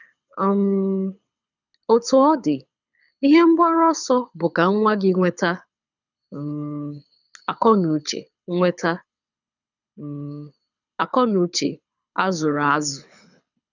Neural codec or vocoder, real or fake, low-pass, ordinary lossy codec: codec, 24 kHz, 6 kbps, HILCodec; fake; 7.2 kHz; none